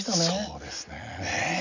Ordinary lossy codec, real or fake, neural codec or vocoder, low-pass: none; real; none; 7.2 kHz